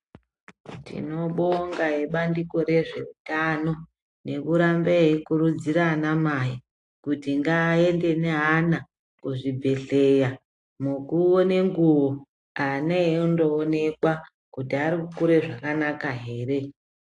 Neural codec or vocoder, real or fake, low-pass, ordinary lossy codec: none; real; 10.8 kHz; AAC, 48 kbps